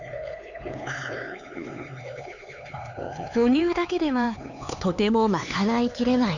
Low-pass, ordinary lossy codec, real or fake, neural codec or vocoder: 7.2 kHz; none; fake; codec, 16 kHz, 4 kbps, X-Codec, HuBERT features, trained on LibriSpeech